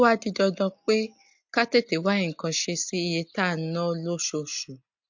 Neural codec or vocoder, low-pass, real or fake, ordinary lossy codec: none; 7.2 kHz; real; MP3, 48 kbps